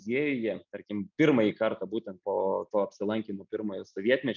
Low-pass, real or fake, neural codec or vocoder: 7.2 kHz; real; none